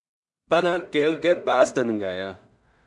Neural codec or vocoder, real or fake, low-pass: codec, 16 kHz in and 24 kHz out, 0.4 kbps, LongCat-Audio-Codec, two codebook decoder; fake; 10.8 kHz